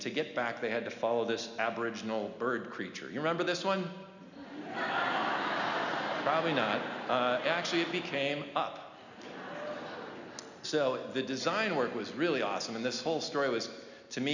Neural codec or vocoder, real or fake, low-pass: none; real; 7.2 kHz